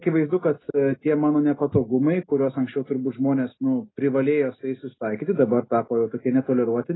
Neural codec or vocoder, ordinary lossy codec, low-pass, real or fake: none; AAC, 16 kbps; 7.2 kHz; real